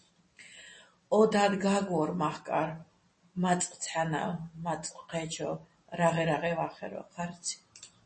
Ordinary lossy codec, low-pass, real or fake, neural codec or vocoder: MP3, 32 kbps; 9.9 kHz; real; none